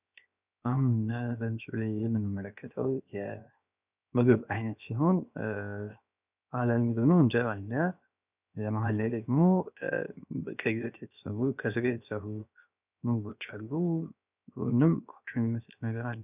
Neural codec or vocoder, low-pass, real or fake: codec, 16 kHz, 0.7 kbps, FocalCodec; 3.6 kHz; fake